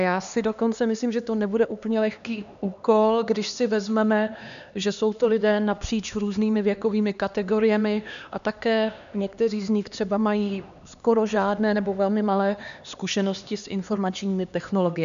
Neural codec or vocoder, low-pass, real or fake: codec, 16 kHz, 2 kbps, X-Codec, HuBERT features, trained on LibriSpeech; 7.2 kHz; fake